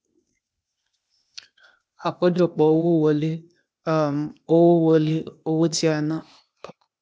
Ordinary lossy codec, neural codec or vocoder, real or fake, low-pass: none; codec, 16 kHz, 0.8 kbps, ZipCodec; fake; none